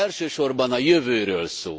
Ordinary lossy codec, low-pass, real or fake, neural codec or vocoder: none; none; real; none